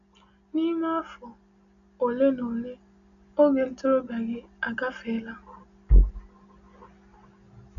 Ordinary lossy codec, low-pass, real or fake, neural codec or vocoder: none; 7.2 kHz; real; none